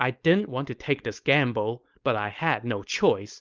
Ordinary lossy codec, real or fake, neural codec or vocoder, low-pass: Opus, 24 kbps; fake; codec, 16 kHz, 4.8 kbps, FACodec; 7.2 kHz